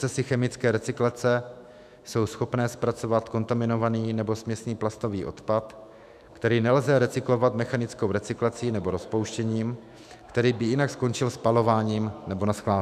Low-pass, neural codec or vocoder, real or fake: 14.4 kHz; autoencoder, 48 kHz, 128 numbers a frame, DAC-VAE, trained on Japanese speech; fake